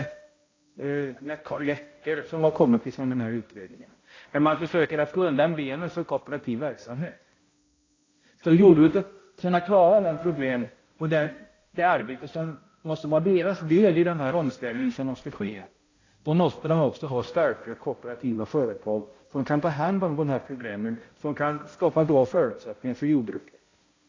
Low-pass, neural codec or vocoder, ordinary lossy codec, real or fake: 7.2 kHz; codec, 16 kHz, 0.5 kbps, X-Codec, HuBERT features, trained on balanced general audio; AAC, 32 kbps; fake